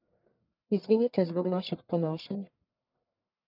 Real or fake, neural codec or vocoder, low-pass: fake; codec, 44.1 kHz, 1.7 kbps, Pupu-Codec; 5.4 kHz